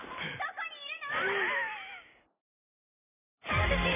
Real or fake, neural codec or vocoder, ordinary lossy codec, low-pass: real; none; none; 3.6 kHz